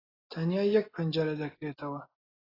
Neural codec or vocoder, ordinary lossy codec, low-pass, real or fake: none; AAC, 24 kbps; 5.4 kHz; real